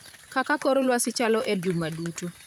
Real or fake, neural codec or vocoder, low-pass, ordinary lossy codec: fake; vocoder, 44.1 kHz, 128 mel bands, Pupu-Vocoder; 19.8 kHz; none